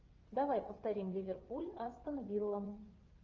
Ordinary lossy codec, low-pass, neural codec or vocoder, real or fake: Opus, 24 kbps; 7.2 kHz; vocoder, 22.05 kHz, 80 mel bands, WaveNeXt; fake